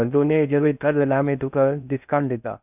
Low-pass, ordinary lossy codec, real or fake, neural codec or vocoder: 3.6 kHz; none; fake; codec, 16 kHz in and 24 kHz out, 0.6 kbps, FocalCodec, streaming, 4096 codes